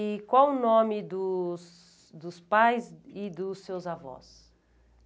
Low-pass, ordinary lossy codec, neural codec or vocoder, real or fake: none; none; none; real